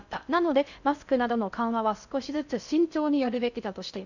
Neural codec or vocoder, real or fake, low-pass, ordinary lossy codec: codec, 16 kHz in and 24 kHz out, 0.6 kbps, FocalCodec, streaming, 4096 codes; fake; 7.2 kHz; none